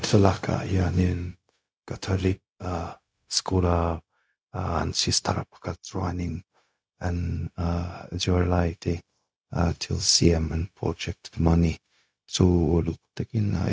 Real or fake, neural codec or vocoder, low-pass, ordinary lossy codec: fake; codec, 16 kHz, 0.4 kbps, LongCat-Audio-Codec; none; none